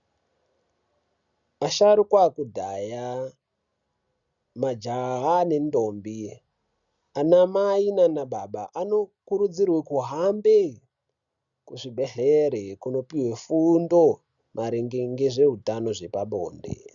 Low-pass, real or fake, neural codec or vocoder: 7.2 kHz; real; none